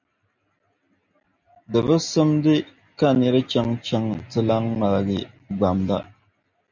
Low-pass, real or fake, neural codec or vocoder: 7.2 kHz; real; none